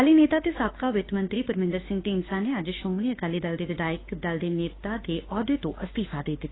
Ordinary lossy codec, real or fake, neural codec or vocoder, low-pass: AAC, 16 kbps; fake; autoencoder, 48 kHz, 32 numbers a frame, DAC-VAE, trained on Japanese speech; 7.2 kHz